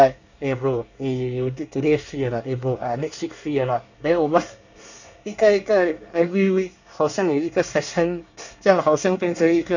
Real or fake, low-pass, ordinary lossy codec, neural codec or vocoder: fake; 7.2 kHz; none; codec, 24 kHz, 1 kbps, SNAC